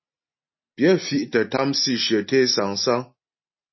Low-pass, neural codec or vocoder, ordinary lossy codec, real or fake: 7.2 kHz; none; MP3, 24 kbps; real